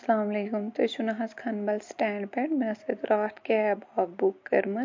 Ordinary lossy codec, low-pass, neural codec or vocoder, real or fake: MP3, 48 kbps; 7.2 kHz; none; real